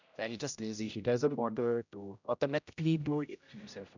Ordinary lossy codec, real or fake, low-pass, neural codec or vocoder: none; fake; 7.2 kHz; codec, 16 kHz, 0.5 kbps, X-Codec, HuBERT features, trained on general audio